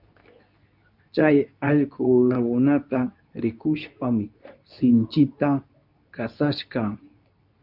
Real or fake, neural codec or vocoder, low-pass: fake; codec, 24 kHz, 0.9 kbps, WavTokenizer, medium speech release version 2; 5.4 kHz